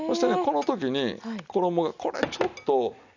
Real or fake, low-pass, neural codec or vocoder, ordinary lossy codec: real; 7.2 kHz; none; none